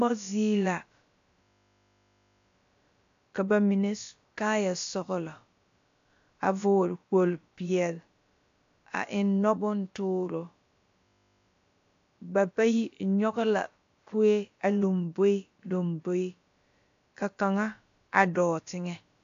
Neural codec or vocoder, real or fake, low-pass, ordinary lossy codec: codec, 16 kHz, about 1 kbps, DyCAST, with the encoder's durations; fake; 7.2 kHz; AAC, 64 kbps